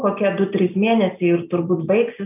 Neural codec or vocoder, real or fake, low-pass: none; real; 3.6 kHz